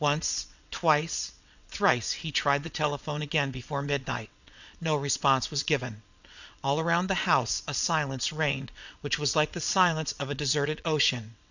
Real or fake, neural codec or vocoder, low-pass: fake; vocoder, 22.05 kHz, 80 mel bands, WaveNeXt; 7.2 kHz